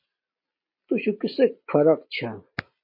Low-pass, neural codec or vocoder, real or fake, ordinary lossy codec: 5.4 kHz; none; real; MP3, 32 kbps